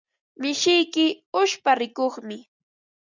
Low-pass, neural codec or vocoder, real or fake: 7.2 kHz; none; real